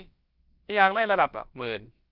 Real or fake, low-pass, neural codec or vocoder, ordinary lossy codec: fake; 5.4 kHz; codec, 16 kHz, about 1 kbps, DyCAST, with the encoder's durations; Opus, 24 kbps